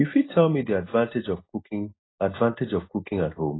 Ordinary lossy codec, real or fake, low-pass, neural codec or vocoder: AAC, 16 kbps; real; 7.2 kHz; none